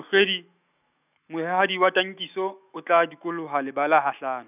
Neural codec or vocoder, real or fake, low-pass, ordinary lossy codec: autoencoder, 48 kHz, 128 numbers a frame, DAC-VAE, trained on Japanese speech; fake; 3.6 kHz; none